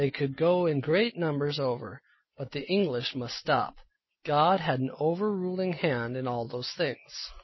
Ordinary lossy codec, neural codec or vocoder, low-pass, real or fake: MP3, 24 kbps; none; 7.2 kHz; real